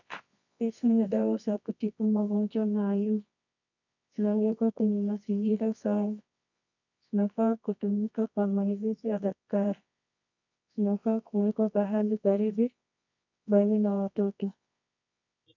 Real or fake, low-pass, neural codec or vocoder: fake; 7.2 kHz; codec, 24 kHz, 0.9 kbps, WavTokenizer, medium music audio release